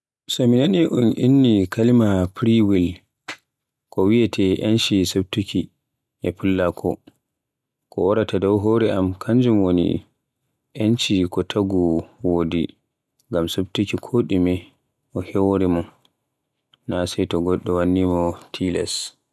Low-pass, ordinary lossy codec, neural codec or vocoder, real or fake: none; none; none; real